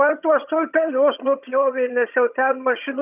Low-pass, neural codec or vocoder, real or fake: 3.6 kHz; vocoder, 22.05 kHz, 80 mel bands, HiFi-GAN; fake